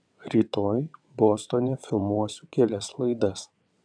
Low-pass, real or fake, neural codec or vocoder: 9.9 kHz; fake; vocoder, 22.05 kHz, 80 mel bands, WaveNeXt